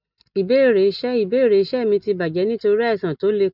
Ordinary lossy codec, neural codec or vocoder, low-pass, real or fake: none; none; 5.4 kHz; real